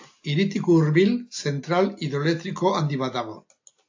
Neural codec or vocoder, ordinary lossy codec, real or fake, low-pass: none; AAC, 48 kbps; real; 7.2 kHz